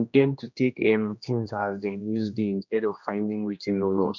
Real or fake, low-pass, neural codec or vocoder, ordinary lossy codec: fake; 7.2 kHz; codec, 16 kHz, 1 kbps, X-Codec, HuBERT features, trained on general audio; none